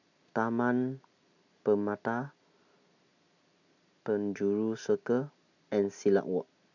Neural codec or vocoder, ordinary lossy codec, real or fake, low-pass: none; none; real; 7.2 kHz